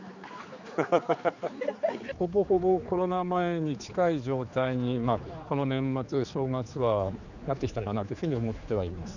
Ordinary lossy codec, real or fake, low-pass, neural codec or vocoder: none; fake; 7.2 kHz; codec, 16 kHz, 4 kbps, X-Codec, HuBERT features, trained on general audio